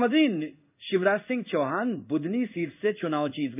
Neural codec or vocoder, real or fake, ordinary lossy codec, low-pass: codec, 16 kHz in and 24 kHz out, 1 kbps, XY-Tokenizer; fake; none; 3.6 kHz